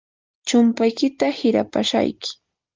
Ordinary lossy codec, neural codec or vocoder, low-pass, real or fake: Opus, 32 kbps; none; 7.2 kHz; real